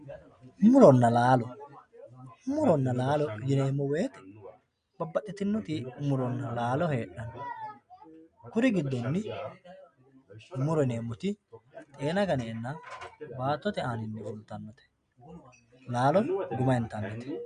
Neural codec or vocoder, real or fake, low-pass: none; real; 9.9 kHz